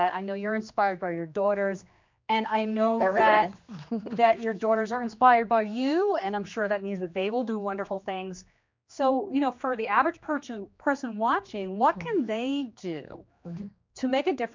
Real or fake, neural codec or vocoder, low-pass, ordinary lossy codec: fake; codec, 16 kHz, 2 kbps, X-Codec, HuBERT features, trained on general audio; 7.2 kHz; MP3, 64 kbps